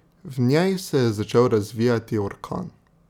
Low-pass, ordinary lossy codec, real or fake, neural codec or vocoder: 19.8 kHz; none; real; none